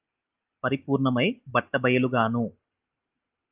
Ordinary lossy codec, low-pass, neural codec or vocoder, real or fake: Opus, 24 kbps; 3.6 kHz; none; real